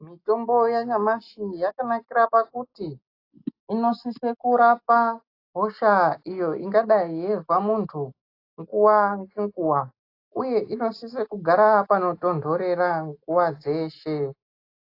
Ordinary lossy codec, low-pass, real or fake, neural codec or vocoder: AAC, 32 kbps; 5.4 kHz; real; none